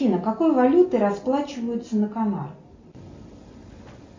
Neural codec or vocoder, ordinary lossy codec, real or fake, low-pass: none; MP3, 64 kbps; real; 7.2 kHz